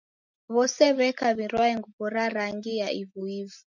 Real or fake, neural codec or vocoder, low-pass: real; none; 7.2 kHz